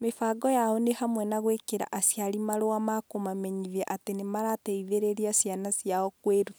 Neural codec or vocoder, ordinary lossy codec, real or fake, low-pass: none; none; real; none